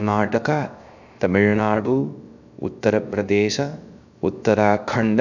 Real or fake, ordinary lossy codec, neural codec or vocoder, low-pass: fake; none; codec, 16 kHz, 0.3 kbps, FocalCodec; 7.2 kHz